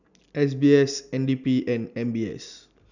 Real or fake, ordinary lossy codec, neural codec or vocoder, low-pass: real; none; none; 7.2 kHz